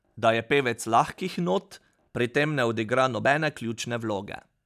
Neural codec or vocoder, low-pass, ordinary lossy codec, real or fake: none; 14.4 kHz; none; real